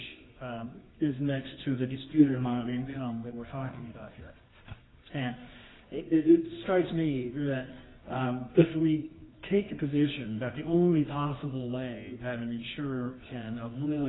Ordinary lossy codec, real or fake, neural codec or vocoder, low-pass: AAC, 16 kbps; fake; codec, 24 kHz, 0.9 kbps, WavTokenizer, medium music audio release; 7.2 kHz